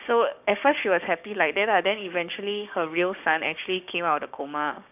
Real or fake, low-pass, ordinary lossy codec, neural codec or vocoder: fake; 3.6 kHz; none; codec, 16 kHz, 6 kbps, DAC